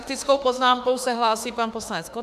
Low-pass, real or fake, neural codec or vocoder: 14.4 kHz; fake; autoencoder, 48 kHz, 32 numbers a frame, DAC-VAE, trained on Japanese speech